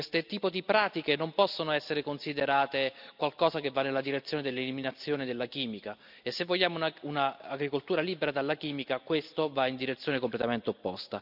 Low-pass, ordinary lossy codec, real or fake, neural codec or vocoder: 5.4 kHz; none; real; none